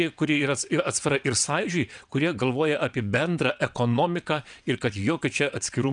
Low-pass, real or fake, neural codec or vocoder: 9.9 kHz; fake; vocoder, 22.05 kHz, 80 mel bands, WaveNeXt